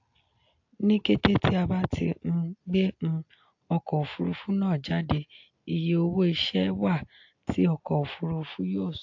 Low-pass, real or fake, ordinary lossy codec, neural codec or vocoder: 7.2 kHz; real; AAC, 48 kbps; none